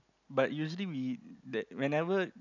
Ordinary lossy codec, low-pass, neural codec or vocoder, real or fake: none; 7.2 kHz; none; real